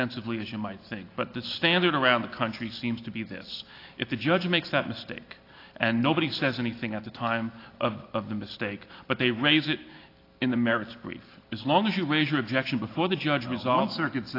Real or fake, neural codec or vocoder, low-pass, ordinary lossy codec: real; none; 5.4 kHz; AAC, 32 kbps